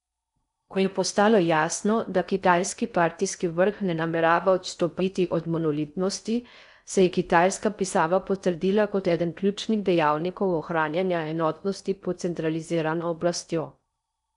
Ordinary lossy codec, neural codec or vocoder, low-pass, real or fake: none; codec, 16 kHz in and 24 kHz out, 0.6 kbps, FocalCodec, streaming, 4096 codes; 10.8 kHz; fake